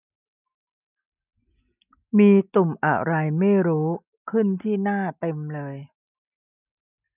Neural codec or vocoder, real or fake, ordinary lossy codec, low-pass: none; real; none; 3.6 kHz